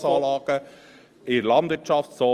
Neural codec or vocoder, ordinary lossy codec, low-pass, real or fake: none; Opus, 32 kbps; 14.4 kHz; real